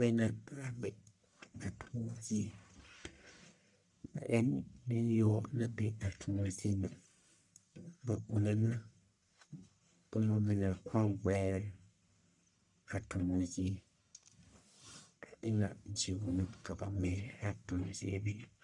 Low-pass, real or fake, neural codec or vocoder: 10.8 kHz; fake; codec, 44.1 kHz, 1.7 kbps, Pupu-Codec